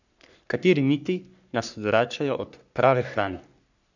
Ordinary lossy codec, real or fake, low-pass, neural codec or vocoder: none; fake; 7.2 kHz; codec, 44.1 kHz, 3.4 kbps, Pupu-Codec